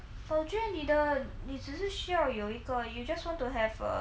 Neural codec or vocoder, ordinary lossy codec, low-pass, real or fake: none; none; none; real